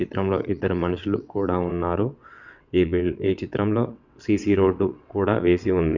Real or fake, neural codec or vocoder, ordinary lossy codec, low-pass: fake; vocoder, 22.05 kHz, 80 mel bands, WaveNeXt; none; 7.2 kHz